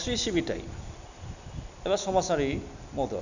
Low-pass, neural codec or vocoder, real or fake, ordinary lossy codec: 7.2 kHz; none; real; none